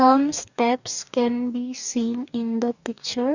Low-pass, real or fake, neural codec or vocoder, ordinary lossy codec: 7.2 kHz; fake; codec, 44.1 kHz, 2.6 kbps, DAC; none